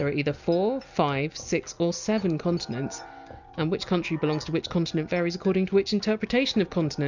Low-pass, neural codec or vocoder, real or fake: 7.2 kHz; none; real